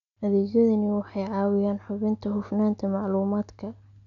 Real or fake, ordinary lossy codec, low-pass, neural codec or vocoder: real; MP3, 96 kbps; 7.2 kHz; none